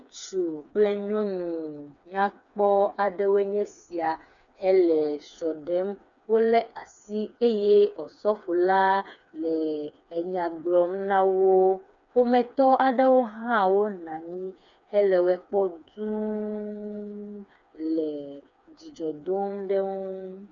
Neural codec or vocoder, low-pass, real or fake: codec, 16 kHz, 4 kbps, FreqCodec, smaller model; 7.2 kHz; fake